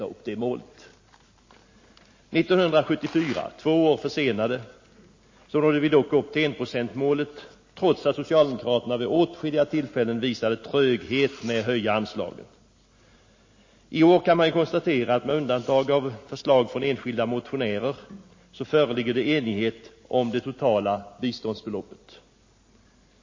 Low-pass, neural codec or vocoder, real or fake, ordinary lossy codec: 7.2 kHz; none; real; MP3, 32 kbps